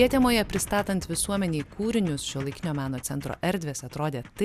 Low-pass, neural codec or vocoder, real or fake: 14.4 kHz; none; real